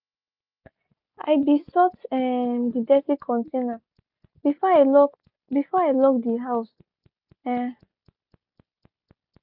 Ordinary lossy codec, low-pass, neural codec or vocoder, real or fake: none; 5.4 kHz; none; real